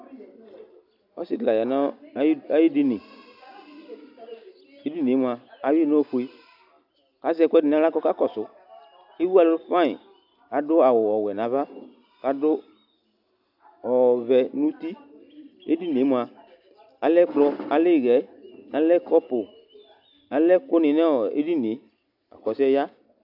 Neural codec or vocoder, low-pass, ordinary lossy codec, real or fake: none; 5.4 kHz; AAC, 48 kbps; real